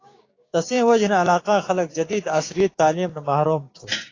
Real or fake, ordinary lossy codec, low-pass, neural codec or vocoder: fake; AAC, 32 kbps; 7.2 kHz; codec, 16 kHz, 6 kbps, DAC